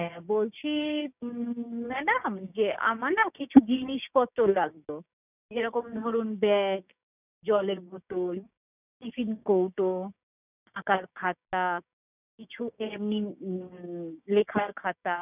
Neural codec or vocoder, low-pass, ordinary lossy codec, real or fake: codec, 16 kHz, 6 kbps, DAC; 3.6 kHz; none; fake